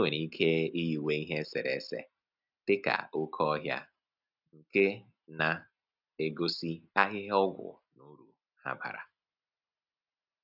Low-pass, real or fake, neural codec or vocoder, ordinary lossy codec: 5.4 kHz; real; none; none